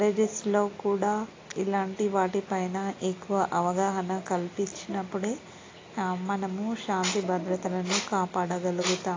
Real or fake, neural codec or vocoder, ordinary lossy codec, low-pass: real; none; AAC, 32 kbps; 7.2 kHz